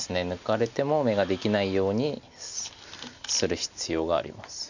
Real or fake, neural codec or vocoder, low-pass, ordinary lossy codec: real; none; 7.2 kHz; none